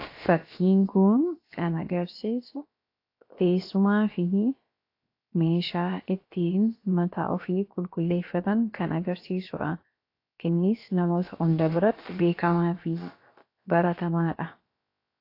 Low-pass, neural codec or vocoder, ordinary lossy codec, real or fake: 5.4 kHz; codec, 16 kHz, about 1 kbps, DyCAST, with the encoder's durations; AAC, 32 kbps; fake